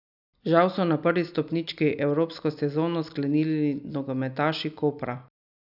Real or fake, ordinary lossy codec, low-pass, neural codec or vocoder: real; none; 5.4 kHz; none